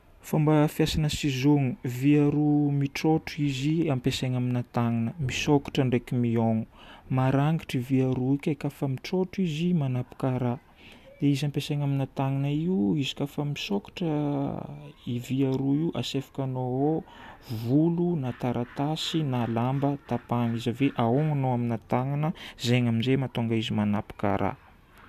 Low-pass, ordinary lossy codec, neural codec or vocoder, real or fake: 14.4 kHz; none; none; real